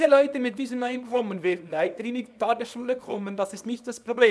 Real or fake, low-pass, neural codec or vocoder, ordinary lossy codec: fake; none; codec, 24 kHz, 0.9 kbps, WavTokenizer, small release; none